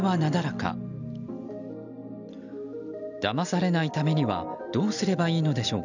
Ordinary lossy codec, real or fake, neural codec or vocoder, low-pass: none; real; none; 7.2 kHz